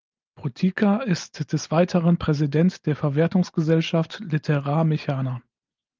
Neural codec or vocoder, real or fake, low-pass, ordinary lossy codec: none; real; 7.2 kHz; Opus, 24 kbps